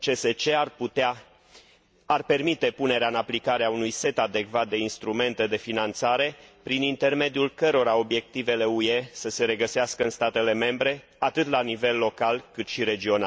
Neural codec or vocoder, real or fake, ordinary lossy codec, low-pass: none; real; none; none